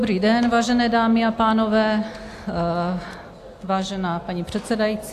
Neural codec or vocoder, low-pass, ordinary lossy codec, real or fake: none; 14.4 kHz; AAC, 64 kbps; real